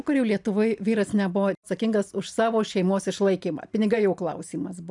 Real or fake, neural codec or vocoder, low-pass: real; none; 10.8 kHz